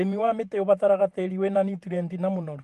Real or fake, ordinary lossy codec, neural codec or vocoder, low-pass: fake; Opus, 24 kbps; vocoder, 48 kHz, 128 mel bands, Vocos; 14.4 kHz